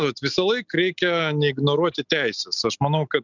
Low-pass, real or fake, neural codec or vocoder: 7.2 kHz; real; none